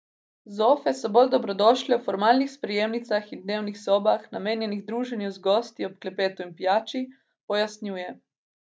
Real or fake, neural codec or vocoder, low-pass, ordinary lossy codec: real; none; none; none